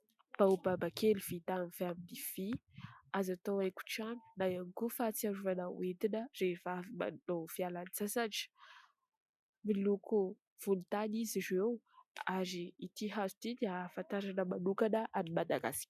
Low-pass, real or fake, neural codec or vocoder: 14.4 kHz; real; none